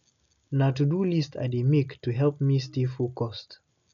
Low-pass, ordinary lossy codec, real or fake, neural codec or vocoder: 7.2 kHz; none; real; none